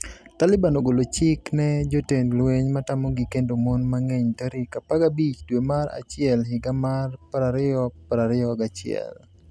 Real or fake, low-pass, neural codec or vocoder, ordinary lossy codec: real; none; none; none